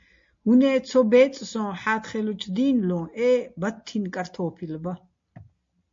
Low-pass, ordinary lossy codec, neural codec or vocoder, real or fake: 7.2 kHz; MP3, 48 kbps; none; real